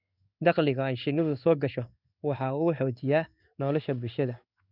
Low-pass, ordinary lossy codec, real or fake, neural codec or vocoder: 5.4 kHz; none; fake; codec, 16 kHz in and 24 kHz out, 1 kbps, XY-Tokenizer